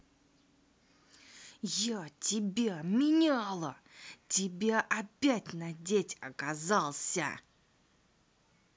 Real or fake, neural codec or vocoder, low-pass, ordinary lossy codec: real; none; none; none